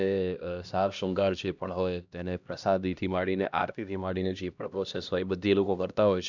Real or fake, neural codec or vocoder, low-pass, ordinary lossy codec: fake; codec, 16 kHz, 1 kbps, X-Codec, HuBERT features, trained on LibriSpeech; 7.2 kHz; none